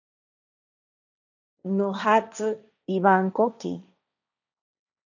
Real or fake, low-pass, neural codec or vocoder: fake; 7.2 kHz; codec, 16 kHz, 1.1 kbps, Voila-Tokenizer